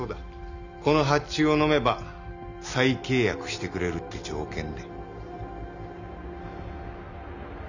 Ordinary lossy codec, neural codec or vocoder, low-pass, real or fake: none; none; 7.2 kHz; real